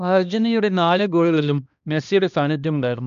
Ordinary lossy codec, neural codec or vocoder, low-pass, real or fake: none; codec, 16 kHz, 1 kbps, X-Codec, HuBERT features, trained on balanced general audio; 7.2 kHz; fake